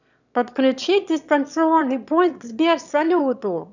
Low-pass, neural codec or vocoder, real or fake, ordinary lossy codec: 7.2 kHz; autoencoder, 22.05 kHz, a latent of 192 numbers a frame, VITS, trained on one speaker; fake; none